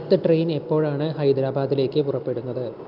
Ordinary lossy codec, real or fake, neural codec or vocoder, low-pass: Opus, 24 kbps; real; none; 5.4 kHz